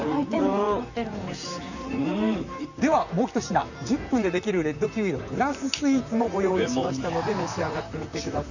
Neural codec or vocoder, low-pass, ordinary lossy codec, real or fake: vocoder, 44.1 kHz, 128 mel bands, Pupu-Vocoder; 7.2 kHz; none; fake